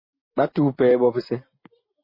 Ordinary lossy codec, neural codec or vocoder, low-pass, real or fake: MP3, 24 kbps; none; 5.4 kHz; real